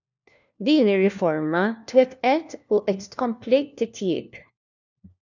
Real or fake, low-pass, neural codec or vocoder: fake; 7.2 kHz; codec, 16 kHz, 1 kbps, FunCodec, trained on LibriTTS, 50 frames a second